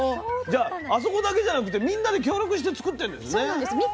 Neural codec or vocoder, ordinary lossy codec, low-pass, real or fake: none; none; none; real